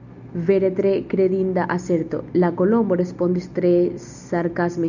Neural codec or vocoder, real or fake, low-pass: none; real; 7.2 kHz